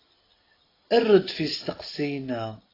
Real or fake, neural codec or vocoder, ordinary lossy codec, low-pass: real; none; AAC, 24 kbps; 5.4 kHz